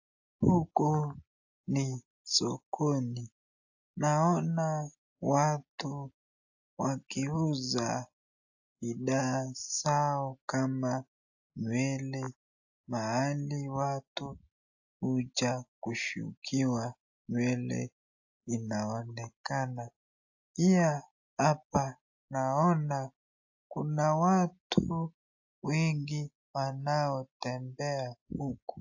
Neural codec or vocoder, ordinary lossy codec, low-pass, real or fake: none; AAC, 48 kbps; 7.2 kHz; real